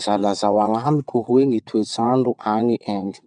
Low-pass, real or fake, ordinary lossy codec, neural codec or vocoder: 9.9 kHz; fake; none; vocoder, 22.05 kHz, 80 mel bands, WaveNeXt